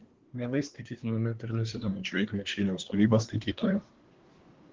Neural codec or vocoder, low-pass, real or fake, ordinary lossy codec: codec, 24 kHz, 1 kbps, SNAC; 7.2 kHz; fake; Opus, 16 kbps